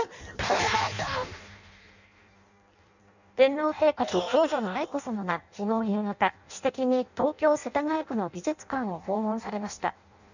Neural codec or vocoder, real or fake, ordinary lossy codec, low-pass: codec, 16 kHz in and 24 kHz out, 0.6 kbps, FireRedTTS-2 codec; fake; none; 7.2 kHz